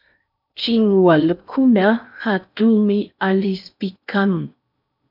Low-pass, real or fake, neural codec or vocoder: 5.4 kHz; fake; codec, 16 kHz in and 24 kHz out, 0.6 kbps, FocalCodec, streaming, 4096 codes